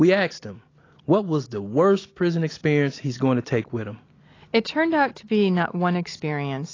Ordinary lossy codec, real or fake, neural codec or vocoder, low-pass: AAC, 32 kbps; real; none; 7.2 kHz